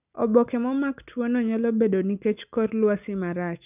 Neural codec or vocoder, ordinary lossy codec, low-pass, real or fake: none; none; 3.6 kHz; real